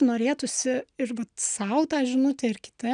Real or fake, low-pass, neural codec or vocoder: real; 9.9 kHz; none